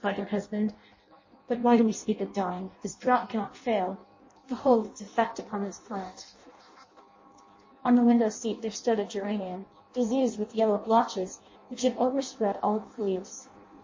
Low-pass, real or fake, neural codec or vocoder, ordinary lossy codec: 7.2 kHz; fake; codec, 16 kHz in and 24 kHz out, 0.6 kbps, FireRedTTS-2 codec; MP3, 32 kbps